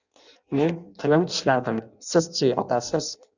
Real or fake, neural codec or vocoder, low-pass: fake; codec, 16 kHz in and 24 kHz out, 0.6 kbps, FireRedTTS-2 codec; 7.2 kHz